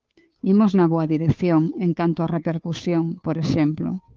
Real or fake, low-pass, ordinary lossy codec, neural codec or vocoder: fake; 7.2 kHz; Opus, 24 kbps; codec, 16 kHz, 2 kbps, FunCodec, trained on Chinese and English, 25 frames a second